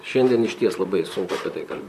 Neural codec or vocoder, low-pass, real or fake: vocoder, 44.1 kHz, 128 mel bands, Pupu-Vocoder; 14.4 kHz; fake